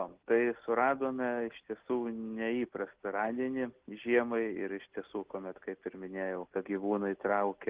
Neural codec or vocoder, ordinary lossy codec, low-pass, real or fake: none; Opus, 16 kbps; 3.6 kHz; real